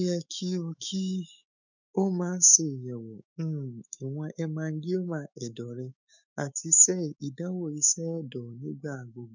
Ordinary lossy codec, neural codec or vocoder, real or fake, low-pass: none; autoencoder, 48 kHz, 128 numbers a frame, DAC-VAE, trained on Japanese speech; fake; 7.2 kHz